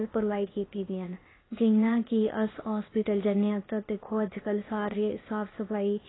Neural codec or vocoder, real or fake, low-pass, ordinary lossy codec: codec, 16 kHz, 0.8 kbps, ZipCodec; fake; 7.2 kHz; AAC, 16 kbps